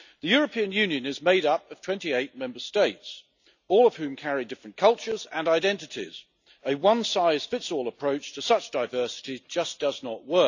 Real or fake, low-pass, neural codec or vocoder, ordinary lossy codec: real; 7.2 kHz; none; none